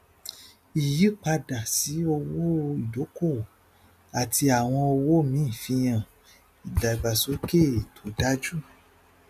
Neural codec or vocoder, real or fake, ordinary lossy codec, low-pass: none; real; none; 14.4 kHz